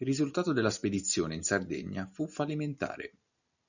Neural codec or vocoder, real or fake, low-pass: none; real; 7.2 kHz